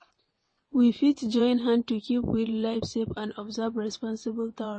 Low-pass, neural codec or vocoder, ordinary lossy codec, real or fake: 9.9 kHz; vocoder, 22.05 kHz, 80 mel bands, WaveNeXt; MP3, 32 kbps; fake